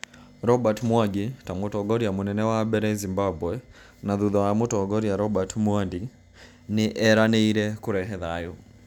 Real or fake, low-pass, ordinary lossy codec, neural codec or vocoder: real; 19.8 kHz; none; none